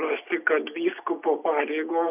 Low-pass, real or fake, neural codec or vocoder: 3.6 kHz; real; none